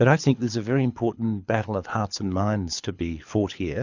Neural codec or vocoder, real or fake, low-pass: codec, 24 kHz, 6 kbps, HILCodec; fake; 7.2 kHz